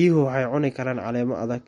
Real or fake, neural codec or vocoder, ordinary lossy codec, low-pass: real; none; MP3, 48 kbps; 19.8 kHz